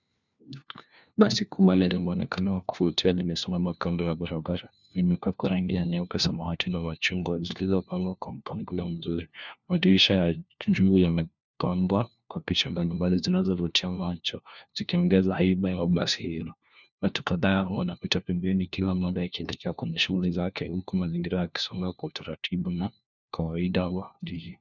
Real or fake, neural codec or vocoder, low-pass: fake; codec, 16 kHz, 1 kbps, FunCodec, trained on LibriTTS, 50 frames a second; 7.2 kHz